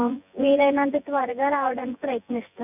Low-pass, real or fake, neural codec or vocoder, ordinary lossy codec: 3.6 kHz; fake; vocoder, 24 kHz, 100 mel bands, Vocos; none